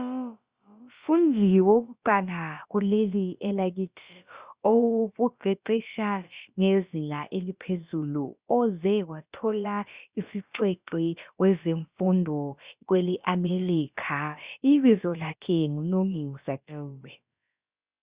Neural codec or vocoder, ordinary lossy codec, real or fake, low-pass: codec, 16 kHz, about 1 kbps, DyCAST, with the encoder's durations; Opus, 64 kbps; fake; 3.6 kHz